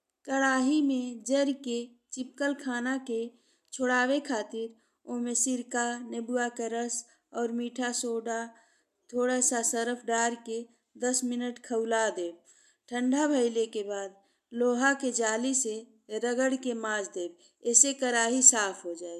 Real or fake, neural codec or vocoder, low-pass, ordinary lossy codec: real; none; 14.4 kHz; none